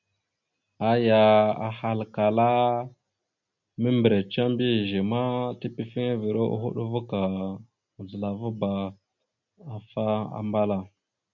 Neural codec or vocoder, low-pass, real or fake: none; 7.2 kHz; real